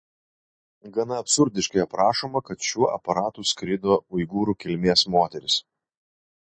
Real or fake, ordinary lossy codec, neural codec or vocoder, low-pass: real; MP3, 32 kbps; none; 9.9 kHz